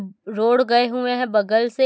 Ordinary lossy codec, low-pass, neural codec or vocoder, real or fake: none; none; none; real